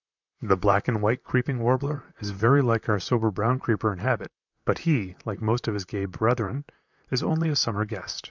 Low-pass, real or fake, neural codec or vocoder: 7.2 kHz; fake; vocoder, 44.1 kHz, 128 mel bands, Pupu-Vocoder